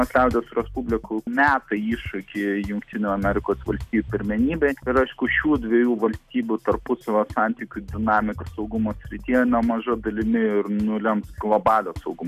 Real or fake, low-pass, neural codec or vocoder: real; 14.4 kHz; none